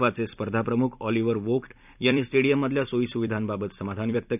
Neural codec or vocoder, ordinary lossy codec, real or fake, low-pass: vocoder, 44.1 kHz, 128 mel bands every 256 samples, BigVGAN v2; none; fake; 3.6 kHz